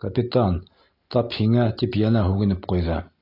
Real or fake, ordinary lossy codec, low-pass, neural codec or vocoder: real; AAC, 32 kbps; 5.4 kHz; none